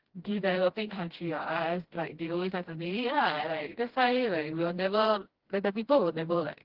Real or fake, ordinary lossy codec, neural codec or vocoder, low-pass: fake; Opus, 16 kbps; codec, 16 kHz, 1 kbps, FreqCodec, smaller model; 5.4 kHz